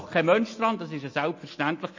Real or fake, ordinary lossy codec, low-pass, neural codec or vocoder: real; MP3, 32 kbps; 7.2 kHz; none